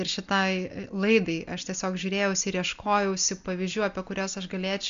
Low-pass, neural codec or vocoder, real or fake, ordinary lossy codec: 7.2 kHz; none; real; MP3, 64 kbps